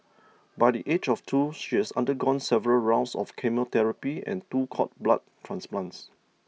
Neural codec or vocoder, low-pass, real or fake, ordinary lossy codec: none; none; real; none